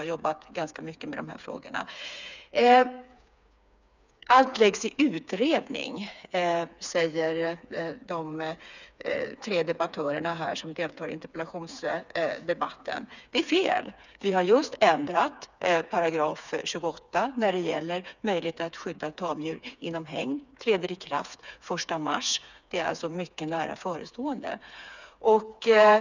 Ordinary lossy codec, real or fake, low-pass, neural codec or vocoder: none; fake; 7.2 kHz; codec, 16 kHz, 4 kbps, FreqCodec, smaller model